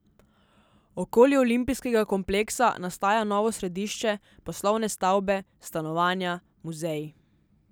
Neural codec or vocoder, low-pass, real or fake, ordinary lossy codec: none; none; real; none